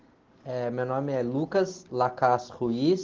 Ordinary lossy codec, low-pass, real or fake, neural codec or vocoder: Opus, 16 kbps; 7.2 kHz; real; none